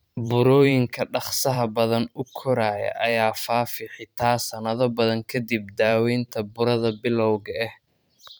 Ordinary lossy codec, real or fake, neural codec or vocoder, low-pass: none; fake; vocoder, 44.1 kHz, 128 mel bands every 512 samples, BigVGAN v2; none